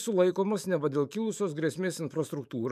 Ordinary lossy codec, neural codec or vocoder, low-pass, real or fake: MP3, 96 kbps; autoencoder, 48 kHz, 128 numbers a frame, DAC-VAE, trained on Japanese speech; 14.4 kHz; fake